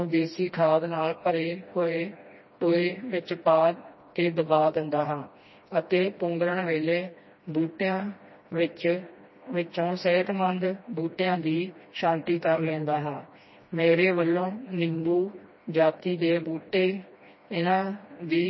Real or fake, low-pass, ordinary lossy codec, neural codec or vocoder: fake; 7.2 kHz; MP3, 24 kbps; codec, 16 kHz, 1 kbps, FreqCodec, smaller model